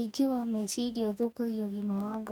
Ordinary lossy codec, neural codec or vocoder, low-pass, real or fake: none; codec, 44.1 kHz, 2.6 kbps, DAC; none; fake